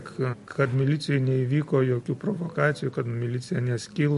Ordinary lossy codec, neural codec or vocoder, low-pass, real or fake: MP3, 48 kbps; none; 14.4 kHz; real